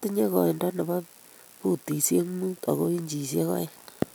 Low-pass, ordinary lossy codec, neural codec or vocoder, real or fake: none; none; none; real